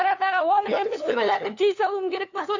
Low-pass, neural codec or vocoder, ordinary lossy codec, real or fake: 7.2 kHz; codec, 16 kHz, 2 kbps, FunCodec, trained on LibriTTS, 25 frames a second; AAC, 48 kbps; fake